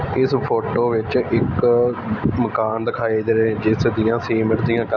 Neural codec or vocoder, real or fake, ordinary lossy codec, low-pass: none; real; none; 7.2 kHz